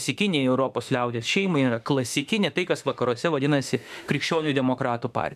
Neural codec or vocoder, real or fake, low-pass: autoencoder, 48 kHz, 32 numbers a frame, DAC-VAE, trained on Japanese speech; fake; 14.4 kHz